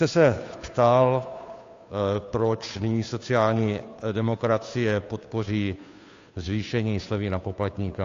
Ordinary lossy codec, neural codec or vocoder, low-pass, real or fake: AAC, 48 kbps; codec, 16 kHz, 2 kbps, FunCodec, trained on Chinese and English, 25 frames a second; 7.2 kHz; fake